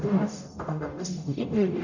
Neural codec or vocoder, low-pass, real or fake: codec, 44.1 kHz, 0.9 kbps, DAC; 7.2 kHz; fake